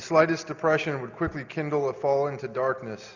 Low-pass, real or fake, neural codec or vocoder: 7.2 kHz; real; none